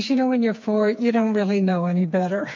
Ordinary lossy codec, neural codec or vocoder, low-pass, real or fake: MP3, 48 kbps; codec, 16 kHz, 4 kbps, FreqCodec, smaller model; 7.2 kHz; fake